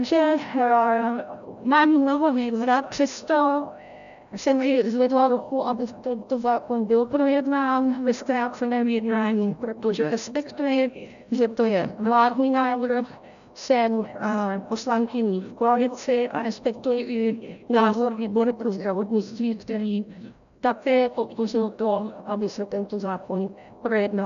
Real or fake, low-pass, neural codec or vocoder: fake; 7.2 kHz; codec, 16 kHz, 0.5 kbps, FreqCodec, larger model